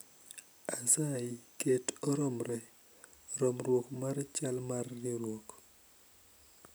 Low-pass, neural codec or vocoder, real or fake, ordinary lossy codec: none; none; real; none